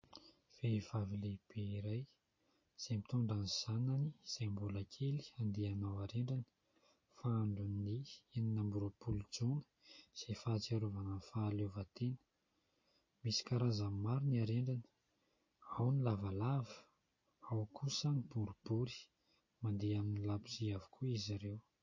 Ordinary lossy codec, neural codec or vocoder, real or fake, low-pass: MP3, 32 kbps; none; real; 7.2 kHz